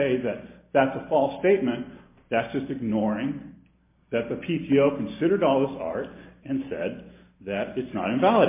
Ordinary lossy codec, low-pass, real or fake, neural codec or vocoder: MP3, 24 kbps; 3.6 kHz; real; none